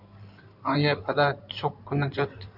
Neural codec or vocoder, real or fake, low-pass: codec, 16 kHz in and 24 kHz out, 2.2 kbps, FireRedTTS-2 codec; fake; 5.4 kHz